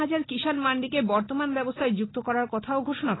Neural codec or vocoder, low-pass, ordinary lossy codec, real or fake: none; 7.2 kHz; AAC, 16 kbps; real